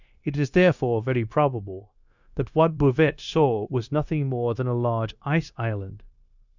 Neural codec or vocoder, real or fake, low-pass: codec, 16 kHz, 0.9 kbps, LongCat-Audio-Codec; fake; 7.2 kHz